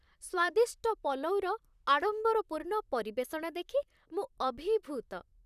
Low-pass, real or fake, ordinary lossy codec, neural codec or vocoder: 14.4 kHz; fake; none; vocoder, 44.1 kHz, 128 mel bands, Pupu-Vocoder